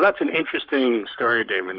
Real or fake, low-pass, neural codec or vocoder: fake; 5.4 kHz; codec, 44.1 kHz, 7.8 kbps, Pupu-Codec